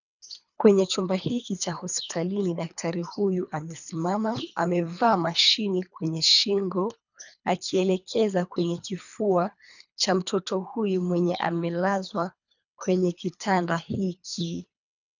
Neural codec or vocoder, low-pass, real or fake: codec, 24 kHz, 3 kbps, HILCodec; 7.2 kHz; fake